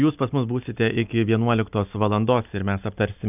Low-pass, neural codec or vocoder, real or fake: 3.6 kHz; none; real